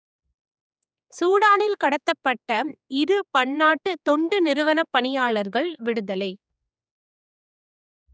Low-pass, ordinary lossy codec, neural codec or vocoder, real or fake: none; none; codec, 16 kHz, 4 kbps, X-Codec, HuBERT features, trained on general audio; fake